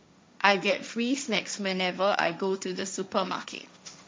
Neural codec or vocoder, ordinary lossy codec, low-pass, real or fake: codec, 16 kHz, 1.1 kbps, Voila-Tokenizer; none; none; fake